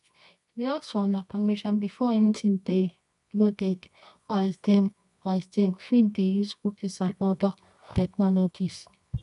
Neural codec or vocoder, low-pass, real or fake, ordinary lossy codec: codec, 24 kHz, 0.9 kbps, WavTokenizer, medium music audio release; 10.8 kHz; fake; none